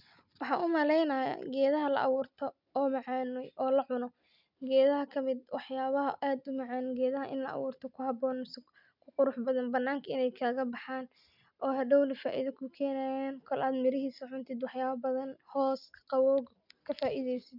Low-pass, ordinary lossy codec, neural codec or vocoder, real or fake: 5.4 kHz; none; none; real